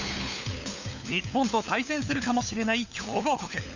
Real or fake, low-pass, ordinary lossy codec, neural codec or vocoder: fake; 7.2 kHz; none; codec, 16 kHz, 4 kbps, FunCodec, trained on LibriTTS, 50 frames a second